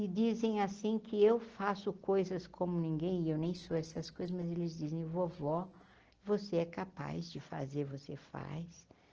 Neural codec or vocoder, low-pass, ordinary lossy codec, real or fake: none; 7.2 kHz; Opus, 16 kbps; real